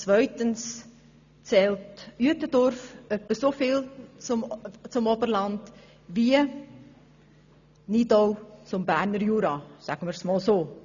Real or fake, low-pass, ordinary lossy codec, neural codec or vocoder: real; 7.2 kHz; none; none